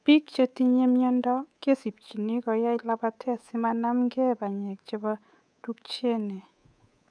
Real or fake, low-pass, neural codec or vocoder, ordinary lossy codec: fake; 9.9 kHz; codec, 24 kHz, 3.1 kbps, DualCodec; none